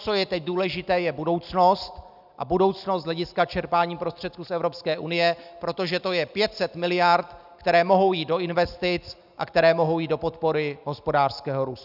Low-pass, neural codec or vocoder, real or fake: 5.4 kHz; none; real